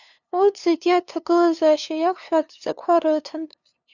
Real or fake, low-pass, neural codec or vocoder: fake; 7.2 kHz; codec, 16 kHz, 2 kbps, FunCodec, trained on LibriTTS, 25 frames a second